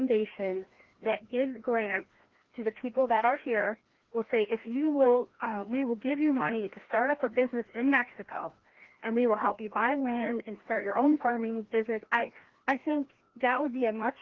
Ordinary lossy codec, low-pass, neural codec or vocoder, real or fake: Opus, 16 kbps; 7.2 kHz; codec, 16 kHz, 1 kbps, FreqCodec, larger model; fake